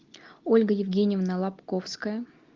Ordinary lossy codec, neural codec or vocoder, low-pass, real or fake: Opus, 32 kbps; none; 7.2 kHz; real